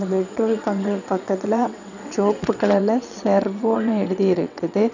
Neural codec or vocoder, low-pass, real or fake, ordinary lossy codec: vocoder, 22.05 kHz, 80 mel bands, WaveNeXt; 7.2 kHz; fake; none